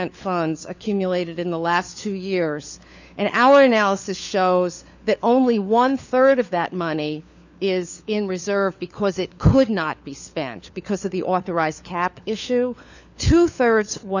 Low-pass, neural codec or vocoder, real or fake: 7.2 kHz; codec, 16 kHz, 4 kbps, FunCodec, trained on LibriTTS, 50 frames a second; fake